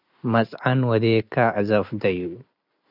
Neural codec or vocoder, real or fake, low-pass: none; real; 5.4 kHz